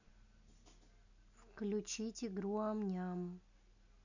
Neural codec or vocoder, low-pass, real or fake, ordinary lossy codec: none; 7.2 kHz; real; none